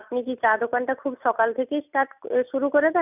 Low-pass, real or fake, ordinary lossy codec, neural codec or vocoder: 3.6 kHz; real; none; none